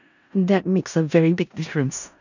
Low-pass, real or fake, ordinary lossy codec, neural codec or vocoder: 7.2 kHz; fake; none; codec, 16 kHz in and 24 kHz out, 0.4 kbps, LongCat-Audio-Codec, four codebook decoder